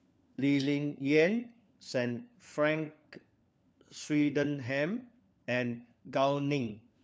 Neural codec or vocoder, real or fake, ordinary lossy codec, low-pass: codec, 16 kHz, 4 kbps, FunCodec, trained on LibriTTS, 50 frames a second; fake; none; none